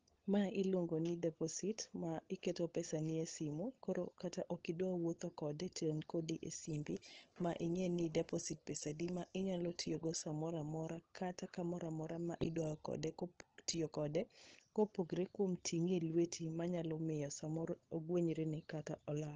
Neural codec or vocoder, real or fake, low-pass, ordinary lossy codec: codec, 16 kHz, 16 kbps, FunCodec, trained on LibriTTS, 50 frames a second; fake; 7.2 kHz; Opus, 16 kbps